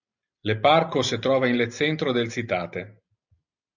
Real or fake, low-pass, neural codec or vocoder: real; 7.2 kHz; none